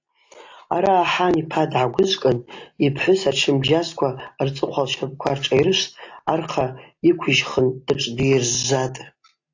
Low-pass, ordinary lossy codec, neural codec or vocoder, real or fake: 7.2 kHz; AAC, 48 kbps; none; real